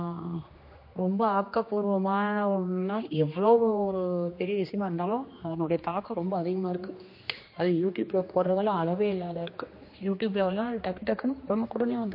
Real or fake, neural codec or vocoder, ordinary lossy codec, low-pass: fake; codec, 16 kHz, 2 kbps, X-Codec, HuBERT features, trained on general audio; MP3, 32 kbps; 5.4 kHz